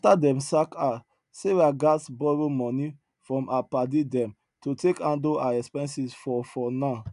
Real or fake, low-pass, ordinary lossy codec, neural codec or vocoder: real; 10.8 kHz; none; none